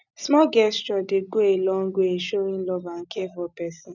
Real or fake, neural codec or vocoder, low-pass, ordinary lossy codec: real; none; 7.2 kHz; none